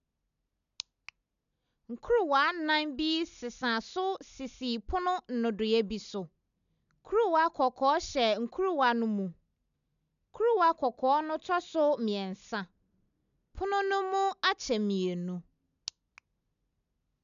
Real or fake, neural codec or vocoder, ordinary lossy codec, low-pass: real; none; none; 7.2 kHz